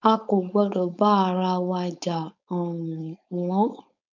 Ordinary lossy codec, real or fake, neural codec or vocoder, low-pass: none; fake; codec, 16 kHz, 4.8 kbps, FACodec; 7.2 kHz